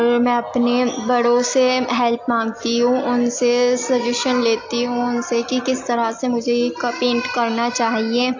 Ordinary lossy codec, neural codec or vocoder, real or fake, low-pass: AAC, 48 kbps; none; real; 7.2 kHz